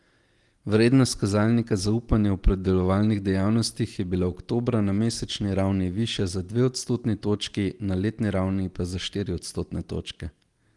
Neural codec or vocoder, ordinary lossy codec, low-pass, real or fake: none; Opus, 24 kbps; 10.8 kHz; real